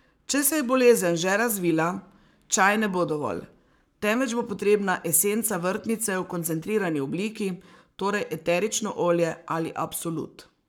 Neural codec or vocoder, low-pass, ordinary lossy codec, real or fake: codec, 44.1 kHz, 7.8 kbps, Pupu-Codec; none; none; fake